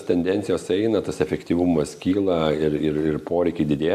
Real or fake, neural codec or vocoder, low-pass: real; none; 14.4 kHz